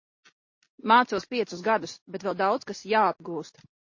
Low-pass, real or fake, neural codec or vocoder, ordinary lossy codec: 7.2 kHz; real; none; MP3, 32 kbps